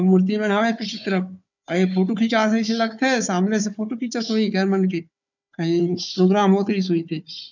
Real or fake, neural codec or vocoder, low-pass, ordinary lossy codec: fake; codec, 16 kHz, 4 kbps, FunCodec, trained on Chinese and English, 50 frames a second; 7.2 kHz; none